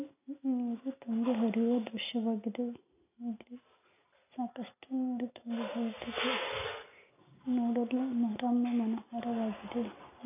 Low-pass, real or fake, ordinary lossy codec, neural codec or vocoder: 3.6 kHz; real; none; none